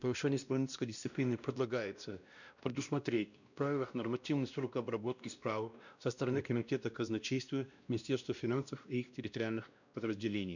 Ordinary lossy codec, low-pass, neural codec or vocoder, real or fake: none; 7.2 kHz; codec, 16 kHz, 1 kbps, X-Codec, WavLM features, trained on Multilingual LibriSpeech; fake